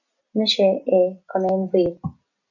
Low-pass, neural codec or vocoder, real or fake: 7.2 kHz; none; real